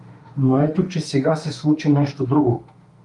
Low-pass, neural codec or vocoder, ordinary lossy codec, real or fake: 10.8 kHz; autoencoder, 48 kHz, 32 numbers a frame, DAC-VAE, trained on Japanese speech; Opus, 32 kbps; fake